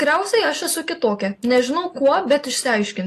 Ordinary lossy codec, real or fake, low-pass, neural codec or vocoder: AAC, 48 kbps; real; 14.4 kHz; none